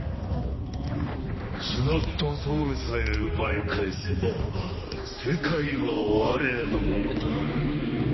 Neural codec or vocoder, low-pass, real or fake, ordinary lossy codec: codec, 16 kHz, 2 kbps, X-Codec, HuBERT features, trained on balanced general audio; 7.2 kHz; fake; MP3, 24 kbps